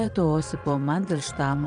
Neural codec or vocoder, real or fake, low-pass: vocoder, 22.05 kHz, 80 mel bands, Vocos; fake; 9.9 kHz